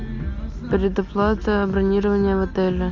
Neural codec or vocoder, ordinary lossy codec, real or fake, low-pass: none; MP3, 64 kbps; real; 7.2 kHz